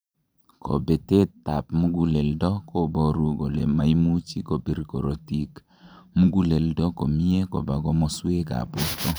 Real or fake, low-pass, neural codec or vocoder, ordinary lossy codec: fake; none; vocoder, 44.1 kHz, 128 mel bands every 512 samples, BigVGAN v2; none